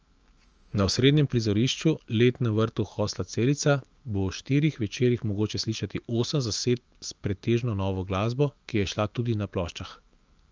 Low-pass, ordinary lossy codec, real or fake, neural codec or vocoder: 7.2 kHz; Opus, 24 kbps; fake; autoencoder, 48 kHz, 128 numbers a frame, DAC-VAE, trained on Japanese speech